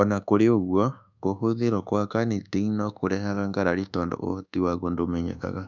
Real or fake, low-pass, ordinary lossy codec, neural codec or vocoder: fake; 7.2 kHz; none; codec, 24 kHz, 1.2 kbps, DualCodec